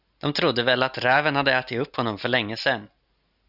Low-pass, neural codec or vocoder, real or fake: 5.4 kHz; none; real